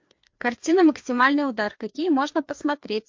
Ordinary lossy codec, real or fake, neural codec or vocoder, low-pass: MP3, 48 kbps; fake; codec, 16 kHz, 2 kbps, FreqCodec, larger model; 7.2 kHz